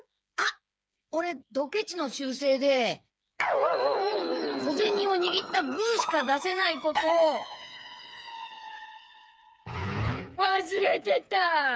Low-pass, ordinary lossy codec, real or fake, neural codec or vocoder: none; none; fake; codec, 16 kHz, 4 kbps, FreqCodec, smaller model